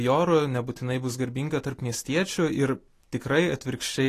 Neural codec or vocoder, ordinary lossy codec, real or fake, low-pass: none; AAC, 48 kbps; real; 14.4 kHz